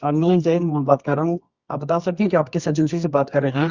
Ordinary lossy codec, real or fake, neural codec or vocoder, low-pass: Opus, 64 kbps; fake; codec, 24 kHz, 0.9 kbps, WavTokenizer, medium music audio release; 7.2 kHz